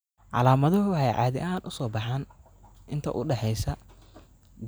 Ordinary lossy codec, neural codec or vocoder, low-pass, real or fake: none; none; none; real